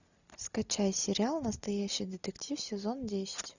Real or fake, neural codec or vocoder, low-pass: real; none; 7.2 kHz